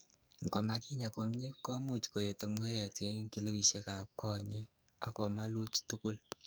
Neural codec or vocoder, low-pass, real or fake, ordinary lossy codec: codec, 44.1 kHz, 2.6 kbps, SNAC; none; fake; none